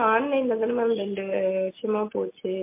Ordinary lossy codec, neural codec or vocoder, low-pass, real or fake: MP3, 24 kbps; none; 3.6 kHz; real